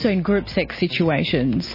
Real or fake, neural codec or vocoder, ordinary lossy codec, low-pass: real; none; MP3, 24 kbps; 5.4 kHz